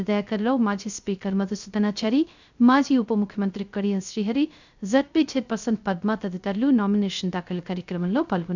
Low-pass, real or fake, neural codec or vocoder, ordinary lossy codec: 7.2 kHz; fake; codec, 16 kHz, 0.3 kbps, FocalCodec; none